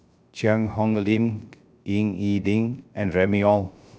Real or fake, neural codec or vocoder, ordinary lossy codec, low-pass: fake; codec, 16 kHz, 0.3 kbps, FocalCodec; none; none